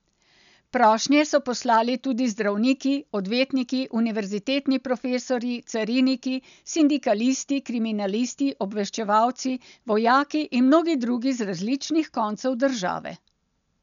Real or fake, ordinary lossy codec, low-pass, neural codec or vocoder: real; none; 7.2 kHz; none